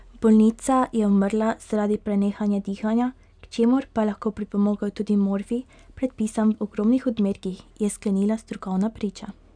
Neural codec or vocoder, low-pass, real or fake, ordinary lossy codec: none; 9.9 kHz; real; none